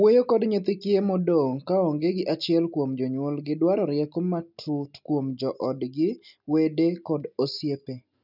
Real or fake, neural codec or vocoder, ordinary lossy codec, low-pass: real; none; none; 5.4 kHz